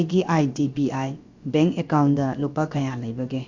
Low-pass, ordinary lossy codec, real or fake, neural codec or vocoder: 7.2 kHz; Opus, 64 kbps; fake; codec, 16 kHz, about 1 kbps, DyCAST, with the encoder's durations